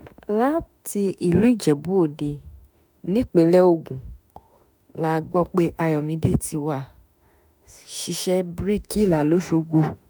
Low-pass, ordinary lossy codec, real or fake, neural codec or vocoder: none; none; fake; autoencoder, 48 kHz, 32 numbers a frame, DAC-VAE, trained on Japanese speech